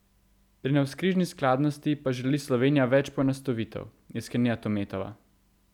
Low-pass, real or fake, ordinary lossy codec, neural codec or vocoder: 19.8 kHz; real; none; none